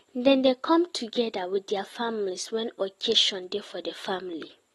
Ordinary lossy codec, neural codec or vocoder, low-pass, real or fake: AAC, 32 kbps; none; 14.4 kHz; real